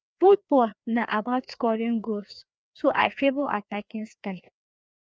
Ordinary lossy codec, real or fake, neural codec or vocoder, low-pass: none; fake; codec, 16 kHz, 2 kbps, FreqCodec, larger model; none